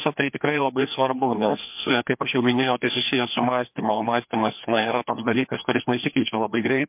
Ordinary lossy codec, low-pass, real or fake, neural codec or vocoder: MP3, 32 kbps; 3.6 kHz; fake; codec, 16 kHz, 2 kbps, FreqCodec, larger model